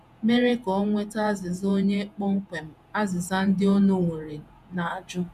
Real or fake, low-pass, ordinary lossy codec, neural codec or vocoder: fake; 14.4 kHz; none; vocoder, 48 kHz, 128 mel bands, Vocos